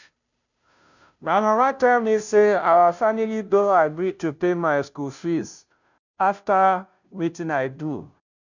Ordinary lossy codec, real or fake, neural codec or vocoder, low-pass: none; fake; codec, 16 kHz, 0.5 kbps, FunCodec, trained on Chinese and English, 25 frames a second; 7.2 kHz